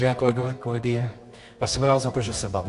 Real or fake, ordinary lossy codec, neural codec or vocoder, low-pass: fake; AAC, 64 kbps; codec, 24 kHz, 0.9 kbps, WavTokenizer, medium music audio release; 10.8 kHz